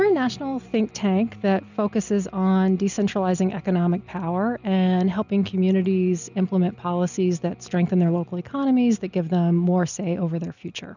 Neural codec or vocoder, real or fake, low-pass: none; real; 7.2 kHz